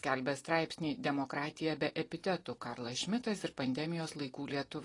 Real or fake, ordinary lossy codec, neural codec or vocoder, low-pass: real; AAC, 32 kbps; none; 10.8 kHz